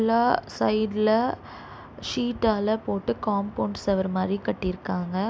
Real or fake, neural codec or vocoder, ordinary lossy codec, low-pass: real; none; none; none